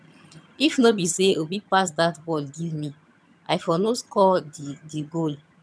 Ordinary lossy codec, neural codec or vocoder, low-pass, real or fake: none; vocoder, 22.05 kHz, 80 mel bands, HiFi-GAN; none; fake